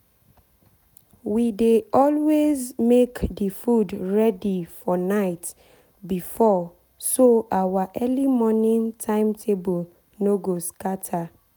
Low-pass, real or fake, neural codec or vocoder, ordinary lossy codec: none; real; none; none